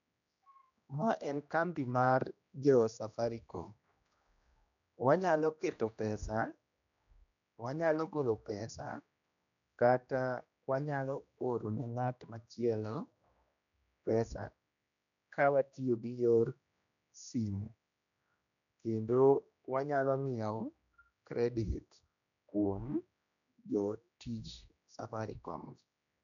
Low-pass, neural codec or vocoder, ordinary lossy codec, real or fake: 7.2 kHz; codec, 16 kHz, 1 kbps, X-Codec, HuBERT features, trained on general audio; none; fake